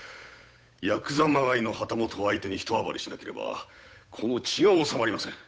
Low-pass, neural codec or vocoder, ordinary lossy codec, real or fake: none; codec, 16 kHz, 8 kbps, FunCodec, trained on Chinese and English, 25 frames a second; none; fake